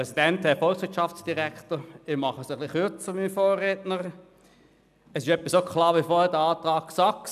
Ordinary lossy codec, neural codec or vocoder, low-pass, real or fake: none; none; 14.4 kHz; real